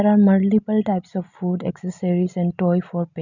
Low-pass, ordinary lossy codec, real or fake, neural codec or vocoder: 7.2 kHz; none; real; none